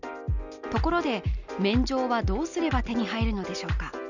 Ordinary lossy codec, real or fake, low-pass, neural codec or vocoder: none; real; 7.2 kHz; none